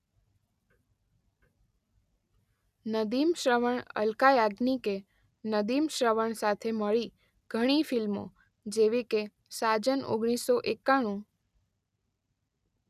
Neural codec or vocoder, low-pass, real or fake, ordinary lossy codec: none; 14.4 kHz; real; none